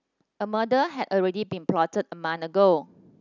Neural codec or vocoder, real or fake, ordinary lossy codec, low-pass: none; real; none; 7.2 kHz